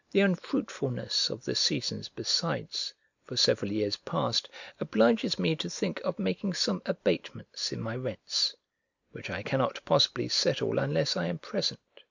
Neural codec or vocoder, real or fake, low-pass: none; real; 7.2 kHz